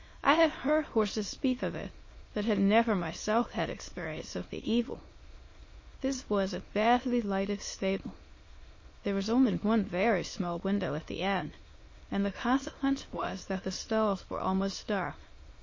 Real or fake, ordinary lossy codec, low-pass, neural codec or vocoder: fake; MP3, 32 kbps; 7.2 kHz; autoencoder, 22.05 kHz, a latent of 192 numbers a frame, VITS, trained on many speakers